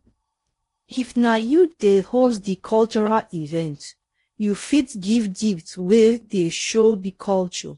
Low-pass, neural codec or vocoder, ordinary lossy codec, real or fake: 10.8 kHz; codec, 16 kHz in and 24 kHz out, 0.6 kbps, FocalCodec, streaming, 4096 codes; AAC, 48 kbps; fake